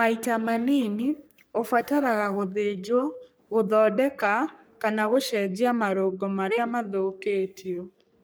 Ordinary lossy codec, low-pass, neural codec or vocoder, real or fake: none; none; codec, 44.1 kHz, 3.4 kbps, Pupu-Codec; fake